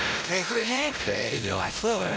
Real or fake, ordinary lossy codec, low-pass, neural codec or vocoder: fake; none; none; codec, 16 kHz, 0.5 kbps, X-Codec, WavLM features, trained on Multilingual LibriSpeech